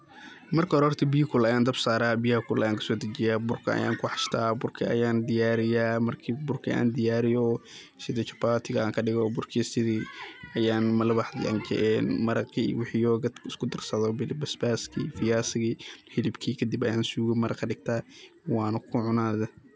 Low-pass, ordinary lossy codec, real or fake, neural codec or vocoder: none; none; real; none